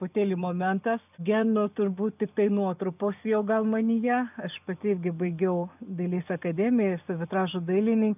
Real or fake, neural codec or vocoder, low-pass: real; none; 3.6 kHz